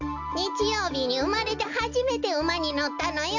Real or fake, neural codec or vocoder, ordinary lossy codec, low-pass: real; none; none; 7.2 kHz